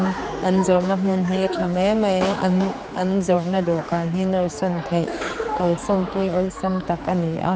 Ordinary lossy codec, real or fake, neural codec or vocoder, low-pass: none; fake; codec, 16 kHz, 4 kbps, X-Codec, HuBERT features, trained on general audio; none